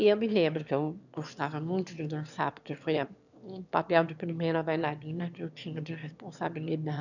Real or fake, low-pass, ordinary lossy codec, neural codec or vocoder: fake; 7.2 kHz; none; autoencoder, 22.05 kHz, a latent of 192 numbers a frame, VITS, trained on one speaker